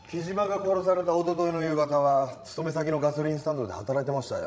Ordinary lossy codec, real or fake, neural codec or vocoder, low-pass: none; fake; codec, 16 kHz, 16 kbps, FreqCodec, larger model; none